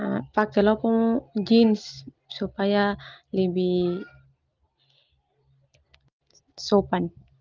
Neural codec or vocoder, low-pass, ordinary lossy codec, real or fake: none; 7.2 kHz; Opus, 24 kbps; real